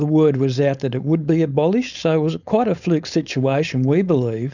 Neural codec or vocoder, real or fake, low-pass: codec, 16 kHz, 4.8 kbps, FACodec; fake; 7.2 kHz